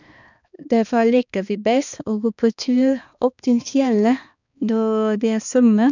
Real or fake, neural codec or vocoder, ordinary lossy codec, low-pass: fake; codec, 16 kHz, 2 kbps, X-Codec, HuBERT features, trained on balanced general audio; none; 7.2 kHz